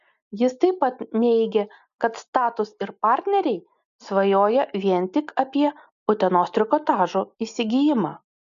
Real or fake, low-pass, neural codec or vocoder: real; 7.2 kHz; none